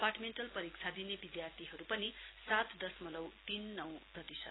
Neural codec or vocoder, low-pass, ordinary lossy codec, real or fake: none; 7.2 kHz; AAC, 16 kbps; real